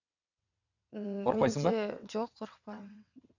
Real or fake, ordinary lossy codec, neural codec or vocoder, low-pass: fake; none; vocoder, 22.05 kHz, 80 mel bands, WaveNeXt; 7.2 kHz